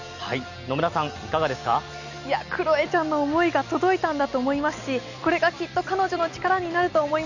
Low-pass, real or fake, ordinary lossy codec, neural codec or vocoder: 7.2 kHz; real; none; none